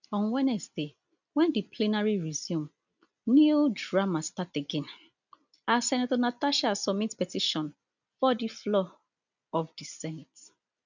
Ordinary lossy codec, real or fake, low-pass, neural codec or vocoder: none; real; 7.2 kHz; none